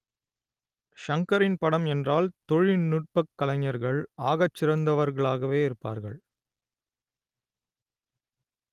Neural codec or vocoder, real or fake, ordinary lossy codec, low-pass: none; real; Opus, 24 kbps; 14.4 kHz